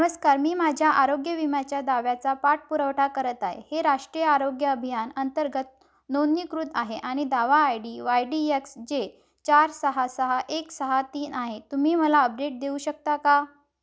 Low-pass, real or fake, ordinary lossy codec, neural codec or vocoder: none; real; none; none